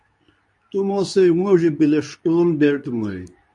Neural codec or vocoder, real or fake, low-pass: codec, 24 kHz, 0.9 kbps, WavTokenizer, medium speech release version 2; fake; 10.8 kHz